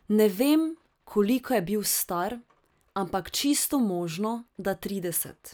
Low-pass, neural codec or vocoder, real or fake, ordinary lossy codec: none; none; real; none